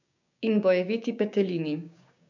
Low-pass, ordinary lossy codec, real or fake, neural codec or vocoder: 7.2 kHz; none; fake; codec, 16 kHz, 6 kbps, DAC